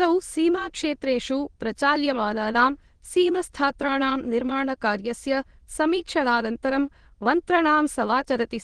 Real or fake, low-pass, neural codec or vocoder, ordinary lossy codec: fake; 9.9 kHz; autoencoder, 22.05 kHz, a latent of 192 numbers a frame, VITS, trained on many speakers; Opus, 16 kbps